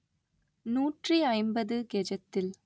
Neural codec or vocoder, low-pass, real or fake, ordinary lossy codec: none; none; real; none